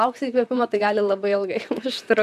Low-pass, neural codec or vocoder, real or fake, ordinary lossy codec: 14.4 kHz; vocoder, 44.1 kHz, 128 mel bands, Pupu-Vocoder; fake; AAC, 96 kbps